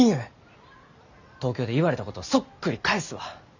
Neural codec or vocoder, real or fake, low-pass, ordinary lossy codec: none; real; 7.2 kHz; none